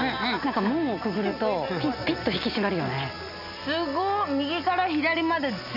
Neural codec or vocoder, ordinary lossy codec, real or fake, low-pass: none; none; real; 5.4 kHz